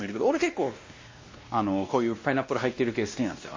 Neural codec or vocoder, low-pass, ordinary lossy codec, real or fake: codec, 16 kHz, 1 kbps, X-Codec, WavLM features, trained on Multilingual LibriSpeech; 7.2 kHz; MP3, 32 kbps; fake